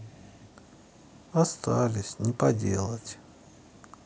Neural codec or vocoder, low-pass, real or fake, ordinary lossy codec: none; none; real; none